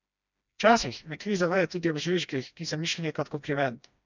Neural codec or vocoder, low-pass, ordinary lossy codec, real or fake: codec, 16 kHz, 1 kbps, FreqCodec, smaller model; 7.2 kHz; none; fake